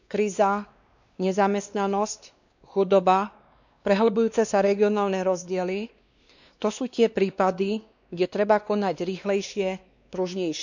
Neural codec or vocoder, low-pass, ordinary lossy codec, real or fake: codec, 16 kHz, 2 kbps, X-Codec, WavLM features, trained on Multilingual LibriSpeech; 7.2 kHz; none; fake